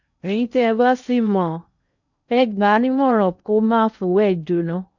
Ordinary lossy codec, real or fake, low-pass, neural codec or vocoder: none; fake; 7.2 kHz; codec, 16 kHz in and 24 kHz out, 0.6 kbps, FocalCodec, streaming, 2048 codes